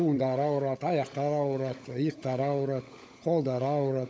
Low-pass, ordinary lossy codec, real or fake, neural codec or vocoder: none; none; fake; codec, 16 kHz, 16 kbps, FunCodec, trained on LibriTTS, 50 frames a second